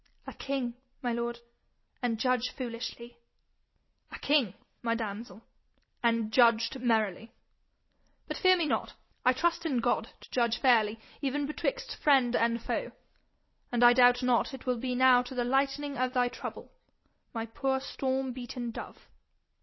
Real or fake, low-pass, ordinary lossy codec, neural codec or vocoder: real; 7.2 kHz; MP3, 24 kbps; none